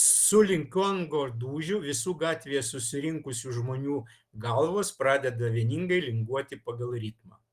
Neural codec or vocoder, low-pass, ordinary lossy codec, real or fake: none; 14.4 kHz; Opus, 24 kbps; real